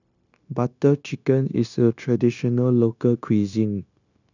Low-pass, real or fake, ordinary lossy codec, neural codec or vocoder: 7.2 kHz; fake; none; codec, 16 kHz, 0.9 kbps, LongCat-Audio-Codec